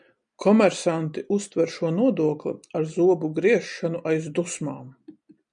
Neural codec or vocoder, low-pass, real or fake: none; 9.9 kHz; real